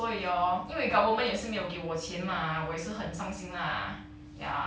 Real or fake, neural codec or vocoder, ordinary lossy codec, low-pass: real; none; none; none